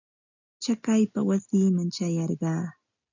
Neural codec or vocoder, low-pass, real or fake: none; 7.2 kHz; real